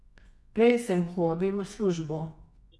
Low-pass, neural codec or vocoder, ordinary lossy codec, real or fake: none; codec, 24 kHz, 0.9 kbps, WavTokenizer, medium music audio release; none; fake